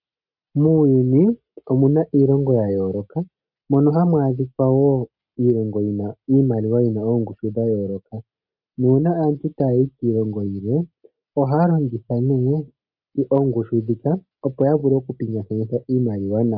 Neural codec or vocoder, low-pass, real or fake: none; 5.4 kHz; real